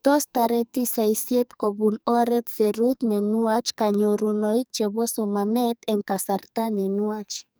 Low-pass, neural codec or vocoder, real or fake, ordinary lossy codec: none; codec, 44.1 kHz, 2.6 kbps, SNAC; fake; none